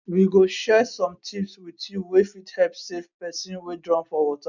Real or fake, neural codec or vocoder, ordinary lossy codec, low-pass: real; none; none; 7.2 kHz